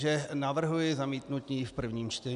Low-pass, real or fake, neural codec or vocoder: 10.8 kHz; real; none